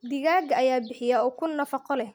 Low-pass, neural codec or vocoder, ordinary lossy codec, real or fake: none; none; none; real